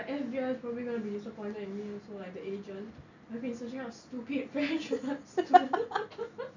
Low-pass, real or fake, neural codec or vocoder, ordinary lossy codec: 7.2 kHz; real; none; none